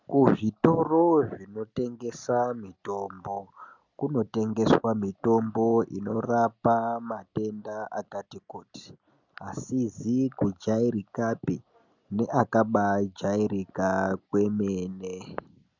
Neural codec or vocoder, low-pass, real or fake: none; 7.2 kHz; real